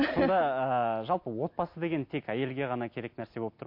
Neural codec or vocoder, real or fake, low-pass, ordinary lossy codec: none; real; 5.4 kHz; MP3, 32 kbps